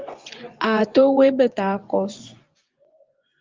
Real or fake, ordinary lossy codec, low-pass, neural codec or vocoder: fake; Opus, 24 kbps; 7.2 kHz; vocoder, 24 kHz, 100 mel bands, Vocos